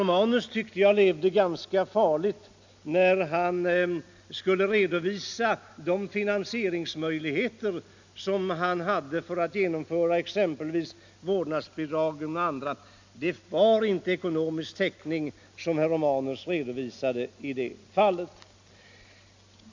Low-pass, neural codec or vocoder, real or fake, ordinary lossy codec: 7.2 kHz; none; real; MP3, 64 kbps